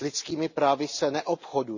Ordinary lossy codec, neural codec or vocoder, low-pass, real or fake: none; none; 7.2 kHz; real